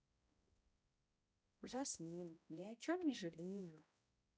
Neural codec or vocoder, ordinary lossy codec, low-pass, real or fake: codec, 16 kHz, 0.5 kbps, X-Codec, HuBERT features, trained on balanced general audio; none; none; fake